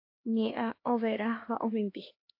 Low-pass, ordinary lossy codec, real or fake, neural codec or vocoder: 5.4 kHz; AAC, 32 kbps; fake; codec, 16 kHz, 2 kbps, X-Codec, HuBERT features, trained on LibriSpeech